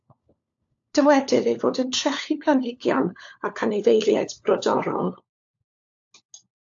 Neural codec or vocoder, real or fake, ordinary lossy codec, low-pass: codec, 16 kHz, 4 kbps, FunCodec, trained on LibriTTS, 50 frames a second; fake; AAC, 64 kbps; 7.2 kHz